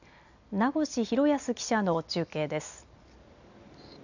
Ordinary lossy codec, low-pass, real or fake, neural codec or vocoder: none; 7.2 kHz; real; none